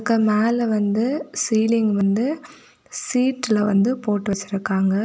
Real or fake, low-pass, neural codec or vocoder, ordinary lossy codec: real; none; none; none